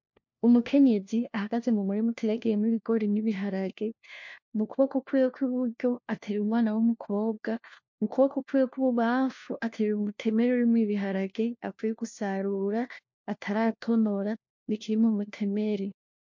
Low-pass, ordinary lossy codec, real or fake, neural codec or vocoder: 7.2 kHz; MP3, 48 kbps; fake; codec, 16 kHz, 1 kbps, FunCodec, trained on LibriTTS, 50 frames a second